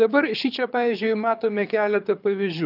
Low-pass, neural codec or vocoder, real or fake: 5.4 kHz; codec, 24 kHz, 6 kbps, HILCodec; fake